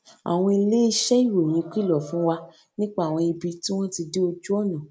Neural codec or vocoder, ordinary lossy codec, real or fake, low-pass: none; none; real; none